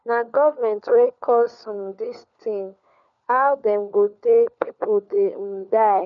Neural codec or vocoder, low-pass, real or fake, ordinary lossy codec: codec, 16 kHz, 4 kbps, FunCodec, trained on LibriTTS, 50 frames a second; 7.2 kHz; fake; none